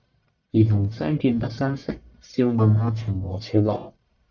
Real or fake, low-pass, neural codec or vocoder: fake; 7.2 kHz; codec, 44.1 kHz, 1.7 kbps, Pupu-Codec